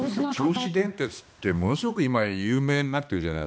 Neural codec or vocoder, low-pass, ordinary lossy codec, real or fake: codec, 16 kHz, 2 kbps, X-Codec, HuBERT features, trained on balanced general audio; none; none; fake